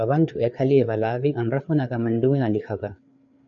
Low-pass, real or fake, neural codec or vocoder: 7.2 kHz; fake; codec, 16 kHz, 4 kbps, FreqCodec, larger model